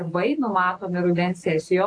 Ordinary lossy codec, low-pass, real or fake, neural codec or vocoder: AAC, 48 kbps; 9.9 kHz; real; none